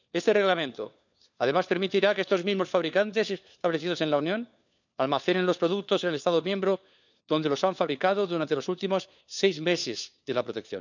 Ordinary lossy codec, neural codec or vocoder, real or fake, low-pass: none; codec, 16 kHz, 4 kbps, FunCodec, trained on LibriTTS, 50 frames a second; fake; 7.2 kHz